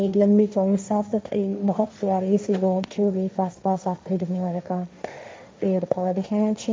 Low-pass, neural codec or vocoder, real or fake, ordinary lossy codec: none; codec, 16 kHz, 1.1 kbps, Voila-Tokenizer; fake; none